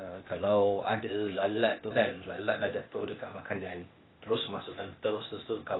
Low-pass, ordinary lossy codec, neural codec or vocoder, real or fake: 7.2 kHz; AAC, 16 kbps; codec, 16 kHz, 0.8 kbps, ZipCodec; fake